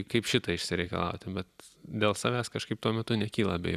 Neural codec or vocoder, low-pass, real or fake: vocoder, 44.1 kHz, 128 mel bands every 256 samples, BigVGAN v2; 14.4 kHz; fake